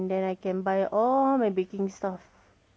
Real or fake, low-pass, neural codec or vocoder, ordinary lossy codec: real; none; none; none